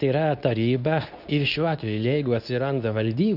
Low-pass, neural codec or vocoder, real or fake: 5.4 kHz; codec, 24 kHz, 0.9 kbps, WavTokenizer, medium speech release version 2; fake